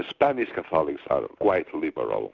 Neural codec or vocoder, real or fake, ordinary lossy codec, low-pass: none; real; Opus, 64 kbps; 7.2 kHz